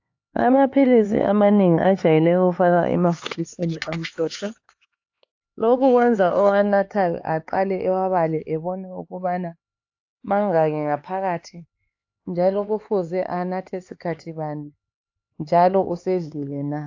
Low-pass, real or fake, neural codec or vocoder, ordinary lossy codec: 7.2 kHz; fake; codec, 16 kHz, 4 kbps, X-Codec, HuBERT features, trained on LibriSpeech; AAC, 48 kbps